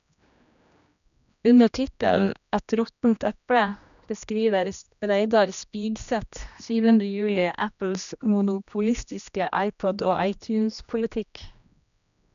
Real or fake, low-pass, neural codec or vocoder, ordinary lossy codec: fake; 7.2 kHz; codec, 16 kHz, 1 kbps, X-Codec, HuBERT features, trained on general audio; none